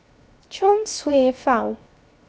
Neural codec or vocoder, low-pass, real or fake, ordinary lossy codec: codec, 16 kHz, 0.7 kbps, FocalCodec; none; fake; none